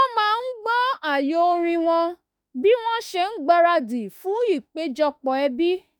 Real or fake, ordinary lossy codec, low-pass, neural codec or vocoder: fake; none; none; autoencoder, 48 kHz, 32 numbers a frame, DAC-VAE, trained on Japanese speech